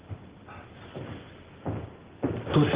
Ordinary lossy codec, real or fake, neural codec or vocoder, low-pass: Opus, 16 kbps; real; none; 3.6 kHz